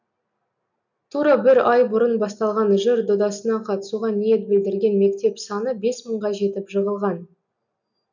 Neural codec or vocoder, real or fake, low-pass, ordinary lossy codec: none; real; 7.2 kHz; none